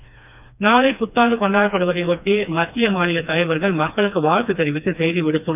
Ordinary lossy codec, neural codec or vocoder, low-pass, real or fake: none; codec, 16 kHz, 2 kbps, FreqCodec, smaller model; 3.6 kHz; fake